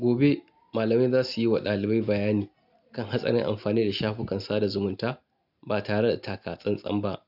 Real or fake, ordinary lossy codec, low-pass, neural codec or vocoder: real; none; 5.4 kHz; none